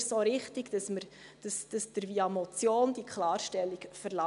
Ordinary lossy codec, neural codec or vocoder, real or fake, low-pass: MP3, 96 kbps; none; real; 10.8 kHz